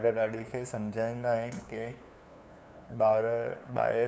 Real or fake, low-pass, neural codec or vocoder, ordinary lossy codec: fake; none; codec, 16 kHz, 2 kbps, FunCodec, trained on LibriTTS, 25 frames a second; none